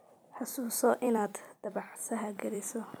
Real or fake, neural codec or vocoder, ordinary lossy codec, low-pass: fake; vocoder, 44.1 kHz, 128 mel bands every 256 samples, BigVGAN v2; none; none